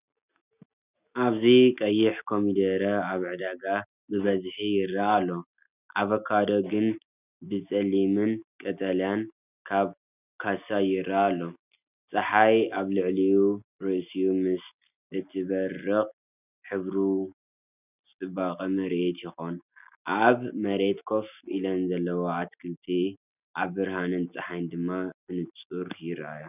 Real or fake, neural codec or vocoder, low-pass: real; none; 3.6 kHz